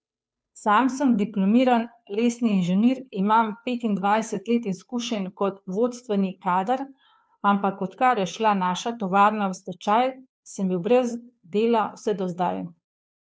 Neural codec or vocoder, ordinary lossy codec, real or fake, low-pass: codec, 16 kHz, 2 kbps, FunCodec, trained on Chinese and English, 25 frames a second; none; fake; none